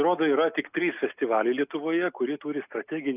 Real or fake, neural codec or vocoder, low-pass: real; none; 3.6 kHz